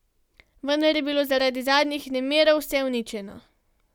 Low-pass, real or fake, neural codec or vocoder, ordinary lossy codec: 19.8 kHz; real; none; none